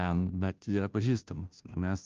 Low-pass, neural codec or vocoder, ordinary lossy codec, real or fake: 7.2 kHz; codec, 16 kHz, 0.5 kbps, FunCodec, trained on Chinese and English, 25 frames a second; Opus, 24 kbps; fake